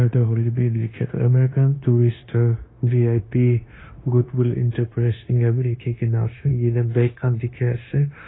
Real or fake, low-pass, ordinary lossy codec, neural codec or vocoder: fake; 7.2 kHz; AAC, 16 kbps; codec, 16 kHz, 0.9 kbps, LongCat-Audio-Codec